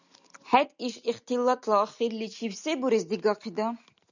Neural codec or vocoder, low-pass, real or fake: none; 7.2 kHz; real